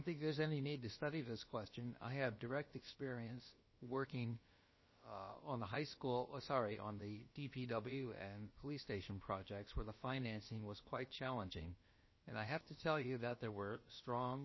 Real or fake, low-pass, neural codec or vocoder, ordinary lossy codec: fake; 7.2 kHz; codec, 16 kHz, about 1 kbps, DyCAST, with the encoder's durations; MP3, 24 kbps